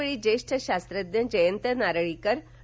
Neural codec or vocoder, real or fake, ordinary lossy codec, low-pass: none; real; none; none